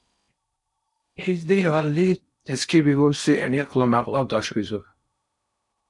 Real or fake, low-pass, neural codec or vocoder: fake; 10.8 kHz; codec, 16 kHz in and 24 kHz out, 0.6 kbps, FocalCodec, streaming, 4096 codes